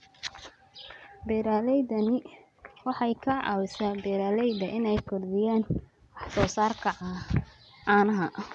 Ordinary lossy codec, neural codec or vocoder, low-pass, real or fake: none; none; none; real